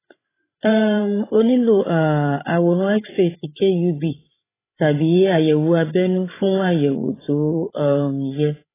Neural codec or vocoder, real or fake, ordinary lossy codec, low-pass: codec, 16 kHz, 16 kbps, FreqCodec, larger model; fake; AAC, 16 kbps; 3.6 kHz